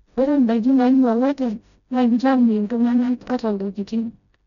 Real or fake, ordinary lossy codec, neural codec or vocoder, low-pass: fake; none; codec, 16 kHz, 0.5 kbps, FreqCodec, smaller model; 7.2 kHz